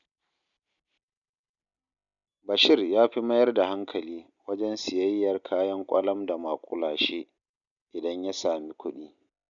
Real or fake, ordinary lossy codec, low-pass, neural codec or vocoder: real; none; 7.2 kHz; none